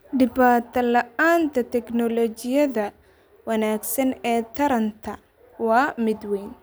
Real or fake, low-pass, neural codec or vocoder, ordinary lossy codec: real; none; none; none